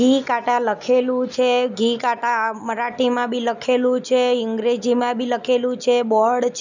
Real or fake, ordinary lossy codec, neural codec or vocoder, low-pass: real; none; none; 7.2 kHz